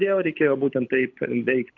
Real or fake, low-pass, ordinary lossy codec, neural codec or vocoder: fake; 7.2 kHz; Opus, 64 kbps; codec, 24 kHz, 6 kbps, HILCodec